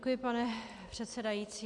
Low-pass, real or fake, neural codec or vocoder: 10.8 kHz; real; none